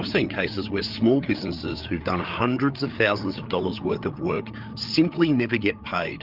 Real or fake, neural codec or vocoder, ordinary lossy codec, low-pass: fake; codec, 16 kHz, 8 kbps, FreqCodec, larger model; Opus, 32 kbps; 5.4 kHz